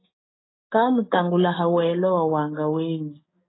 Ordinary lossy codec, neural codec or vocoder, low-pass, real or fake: AAC, 16 kbps; codec, 16 kHz, 6 kbps, DAC; 7.2 kHz; fake